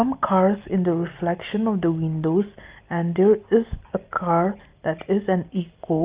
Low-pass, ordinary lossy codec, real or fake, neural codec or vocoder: 3.6 kHz; Opus, 32 kbps; real; none